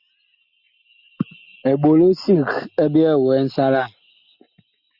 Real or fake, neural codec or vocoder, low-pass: real; none; 5.4 kHz